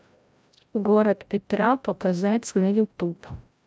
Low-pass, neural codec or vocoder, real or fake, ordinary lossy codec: none; codec, 16 kHz, 0.5 kbps, FreqCodec, larger model; fake; none